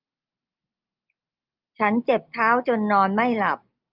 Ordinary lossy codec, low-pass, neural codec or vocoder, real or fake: Opus, 24 kbps; 5.4 kHz; none; real